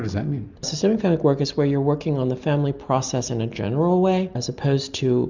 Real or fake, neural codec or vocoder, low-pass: real; none; 7.2 kHz